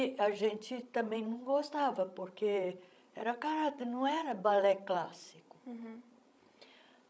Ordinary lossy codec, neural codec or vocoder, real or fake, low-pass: none; codec, 16 kHz, 16 kbps, FreqCodec, larger model; fake; none